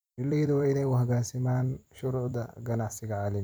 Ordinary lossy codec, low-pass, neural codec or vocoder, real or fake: none; none; vocoder, 44.1 kHz, 128 mel bands every 256 samples, BigVGAN v2; fake